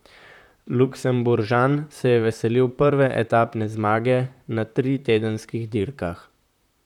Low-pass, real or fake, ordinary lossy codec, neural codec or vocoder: 19.8 kHz; fake; none; vocoder, 44.1 kHz, 128 mel bands, Pupu-Vocoder